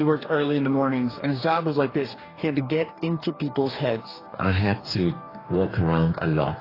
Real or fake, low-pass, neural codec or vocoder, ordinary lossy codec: fake; 5.4 kHz; codec, 44.1 kHz, 2.6 kbps, DAC; AAC, 24 kbps